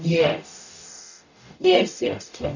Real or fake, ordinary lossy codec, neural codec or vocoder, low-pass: fake; none; codec, 44.1 kHz, 0.9 kbps, DAC; 7.2 kHz